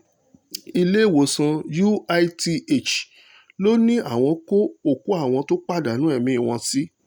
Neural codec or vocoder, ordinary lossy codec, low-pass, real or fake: none; none; none; real